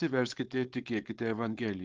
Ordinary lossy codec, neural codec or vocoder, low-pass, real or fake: Opus, 16 kbps; codec, 16 kHz, 4.8 kbps, FACodec; 7.2 kHz; fake